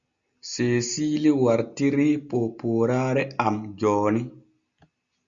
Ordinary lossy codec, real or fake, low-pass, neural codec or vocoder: Opus, 64 kbps; real; 7.2 kHz; none